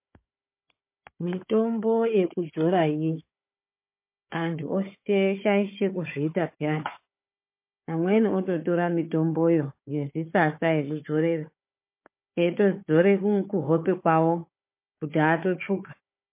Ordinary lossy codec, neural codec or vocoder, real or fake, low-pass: MP3, 24 kbps; codec, 16 kHz, 4 kbps, FunCodec, trained on Chinese and English, 50 frames a second; fake; 3.6 kHz